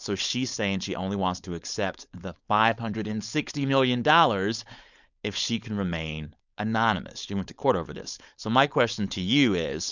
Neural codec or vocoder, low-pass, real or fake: codec, 16 kHz, 4.8 kbps, FACodec; 7.2 kHz; fake